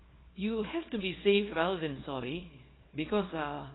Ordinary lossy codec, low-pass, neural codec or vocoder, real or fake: AAC, 16 kbps; 7.2 kHz; codec, 24 kHz, 0.9 kbps, WavTokenizer, small release; fake